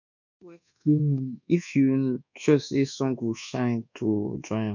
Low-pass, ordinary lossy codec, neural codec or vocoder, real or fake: 7.2 kHz; none; codec, 24 kHz, 1.2 kbps, DualCodec; fake